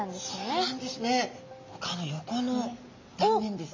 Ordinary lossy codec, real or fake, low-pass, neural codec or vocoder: MP3, 32 kbps; real; 7.2 kHz; none